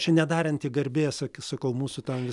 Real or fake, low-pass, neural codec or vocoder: real; 10.8 kHz; none